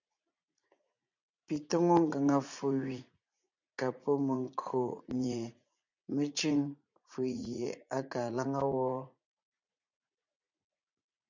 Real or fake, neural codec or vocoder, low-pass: fake; vocoder, 44.1 kHz, 80 mel bands, Vocos; 7.2 kHz